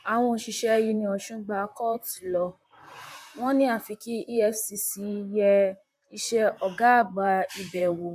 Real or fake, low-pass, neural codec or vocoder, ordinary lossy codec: fake; 14.4 kHz; vocoder, 44.1 kHz, 128 mel bands, Pupu-Vocoder; none